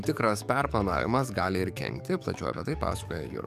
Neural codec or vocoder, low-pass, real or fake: codec, 44.1 kHz, 7.8 kbps, DAC; 14.4 kHz; fake